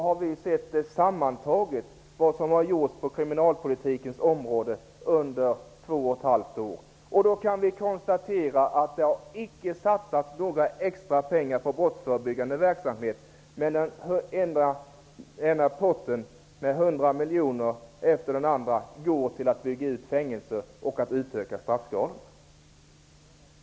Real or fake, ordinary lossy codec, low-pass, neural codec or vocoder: real; none; none; none